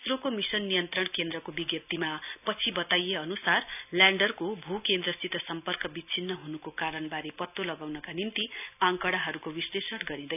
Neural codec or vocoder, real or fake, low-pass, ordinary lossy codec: none; real; 3.6 kHz; none